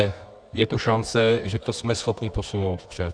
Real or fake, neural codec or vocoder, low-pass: fake; codec, 24 kHz, 0.9 kbps, WavTokenizer, medium music audio release; 9.9 kHz